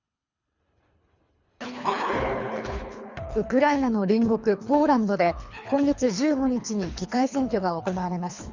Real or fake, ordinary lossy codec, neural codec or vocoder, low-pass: fake; Opus, 64 kbps; codec, 24 kHz, 3 kbps, HILCodec; 7.2 kHz